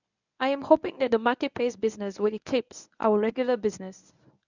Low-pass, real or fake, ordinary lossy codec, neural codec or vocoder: 7.2 kHz; fake; none; codec, 24 kHz, 0.9 kbps, WavTokenizer, medium speech release version 1